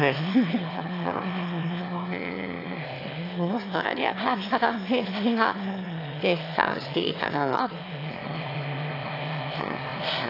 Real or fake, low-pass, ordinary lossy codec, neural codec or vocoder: fake; 5.4 kHz; AAC, 32 kbps; autoencoder, 22.05 kHz, a latent of 192 numbers a frame, VITS, trained on one speaker